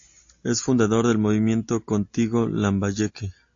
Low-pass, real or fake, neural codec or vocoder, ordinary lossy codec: 7.2 kHz; real; none; AAC, 48 kbps